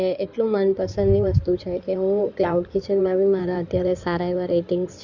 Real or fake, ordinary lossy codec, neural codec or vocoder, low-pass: fake; none; codec, 16 kHz in and 24 kHz out, 2.2 kbps, FireRedTTS-2 codec; 7.2 kHz